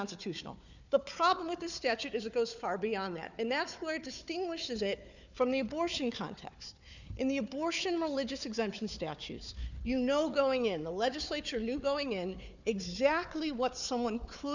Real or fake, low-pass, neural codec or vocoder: fake; 7.2 kHz; codec, 16 kHz, 4 kbps, FunCodec, trained on Chinese and English, 50 frames a second